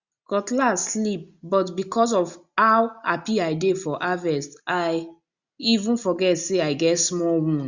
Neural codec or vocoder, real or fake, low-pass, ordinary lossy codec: none; real; 7.2 kHz; Opus, 64 kbps